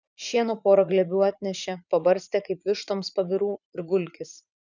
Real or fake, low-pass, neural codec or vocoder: fake; 7.2 kHz; vocoder, 44.1 kHz, 80 mel bands, Vocos